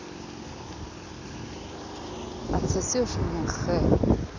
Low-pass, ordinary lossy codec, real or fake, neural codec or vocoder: 7.2 kHz; none; real; none